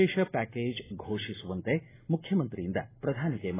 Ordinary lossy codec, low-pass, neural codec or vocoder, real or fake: AAC, 16 kbps; 3.6 kHz; none; real